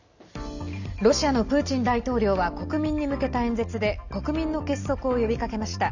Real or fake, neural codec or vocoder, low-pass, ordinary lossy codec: real; none; 7.2 kHz; none